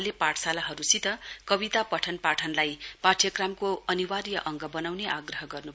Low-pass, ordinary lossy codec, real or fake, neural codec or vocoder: none; none; real; none